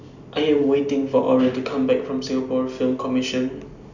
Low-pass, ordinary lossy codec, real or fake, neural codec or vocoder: 7.2 kHz; none; real; none